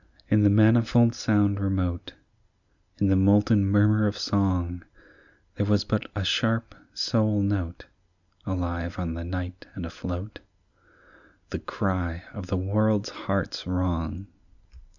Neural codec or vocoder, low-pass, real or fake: none; 7.2 kHz; real